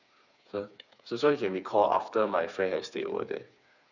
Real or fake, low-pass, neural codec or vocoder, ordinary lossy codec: fake; 7.2 kHz; codec, 16 kHz, 4 kbps, FreqCodec, smaller model; none